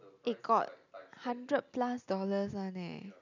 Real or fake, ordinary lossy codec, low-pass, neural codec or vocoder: real; none; 7.2 kHz; none